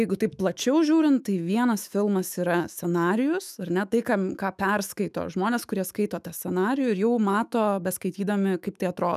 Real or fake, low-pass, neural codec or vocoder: fake; 14.4 kHz; autoencoder, 48 kHz, 128 numbers a frame, DAC-VAE, trained on Japanese speech